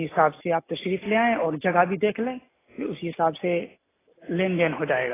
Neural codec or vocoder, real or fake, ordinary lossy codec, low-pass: none; real; AAC, 16 kbps; 3.6 kHz